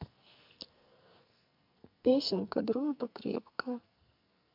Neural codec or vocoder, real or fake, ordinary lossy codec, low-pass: codec, 44.1 kHz, 2.6 kbps, SNAC; fake; MP3, 48 kbps; 5.4 kHz